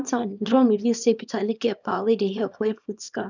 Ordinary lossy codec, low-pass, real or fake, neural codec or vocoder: none; 7.2 kHz; fake; codec, 24 kHz, 0.9 kbps, WavTokenizer, small release